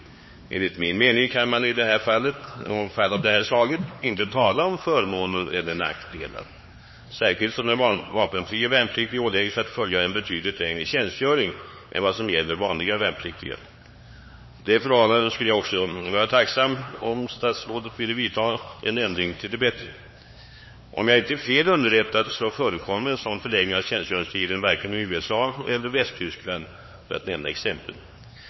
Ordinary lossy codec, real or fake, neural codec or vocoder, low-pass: MP3, 24 kbps; fake; codec, 16 kHz, 4 kbps, X-Codec, HuBERT features, trained on LibriSpeech; 7.2 kHz